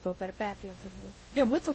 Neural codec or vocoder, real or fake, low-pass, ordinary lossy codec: codec, 16 kHz in and 24 kHz out, 0.6 kbps, FocalCodec, streaming, 2048 codes; fake; 10.8 kHz; MP3, 32 kbps